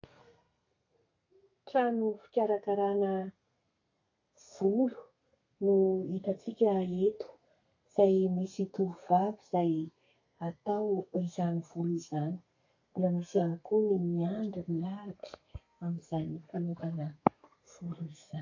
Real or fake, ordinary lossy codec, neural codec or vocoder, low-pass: fake; AAC, 48 kbps; codec, 32 kHz, 1.9 kbps, SNAC; 7.2 kHz